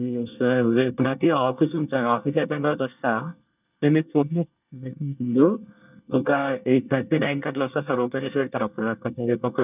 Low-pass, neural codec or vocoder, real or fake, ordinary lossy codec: 3.6 kHz; codec, 24 kHz, 1 kbps, SNAC; fake; none